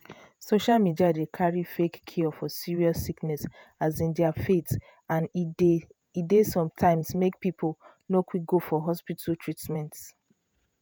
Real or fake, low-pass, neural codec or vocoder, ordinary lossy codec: fake; none; vocoder, 48 kHz, 128 mel bands, Vocos; none